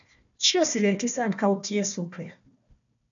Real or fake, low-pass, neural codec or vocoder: fake; 7.2 kHz; codec, 16 kHz, 1 kbps, FunCodec, trained on Chinese and English, 50 frames a second